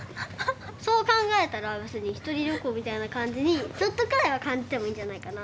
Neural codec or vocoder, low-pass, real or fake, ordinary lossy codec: none; none; real; none